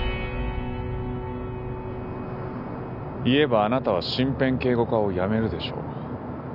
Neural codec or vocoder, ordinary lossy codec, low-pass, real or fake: none; none; 5.4 kHz; real